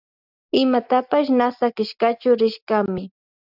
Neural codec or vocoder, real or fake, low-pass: none; real; 5.4 kHz